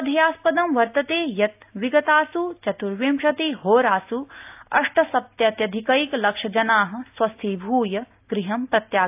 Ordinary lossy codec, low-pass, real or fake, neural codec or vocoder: AAC, 32 kbps; 3.6 kHz; real; none